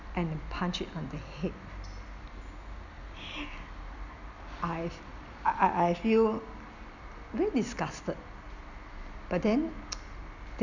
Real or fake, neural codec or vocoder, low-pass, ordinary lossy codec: real; none; 7.2 kHz; none